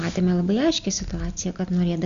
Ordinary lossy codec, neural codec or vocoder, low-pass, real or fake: Opus, 64 kbps; none; 7.2 kHz; real